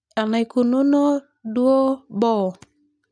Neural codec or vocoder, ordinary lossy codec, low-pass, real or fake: none; none; 9.9 kHz; real